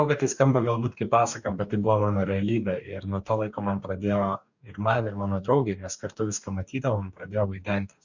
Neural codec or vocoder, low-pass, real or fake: codec, 44.1 kHz, 2.6 kbps, DAC; 7.2 kHz; fake